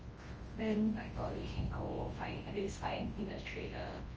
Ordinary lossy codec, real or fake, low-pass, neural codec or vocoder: Opus, 24 kbps; fake; 7.2 kHz; codec, 24 kHz, 0.9 kbps, WavTokenizer, large speech release